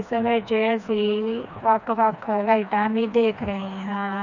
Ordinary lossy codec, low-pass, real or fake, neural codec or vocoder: none; 7.2 kHz; fake; codec, 16 kHz, 2 kbps, FreqCodec, smaller model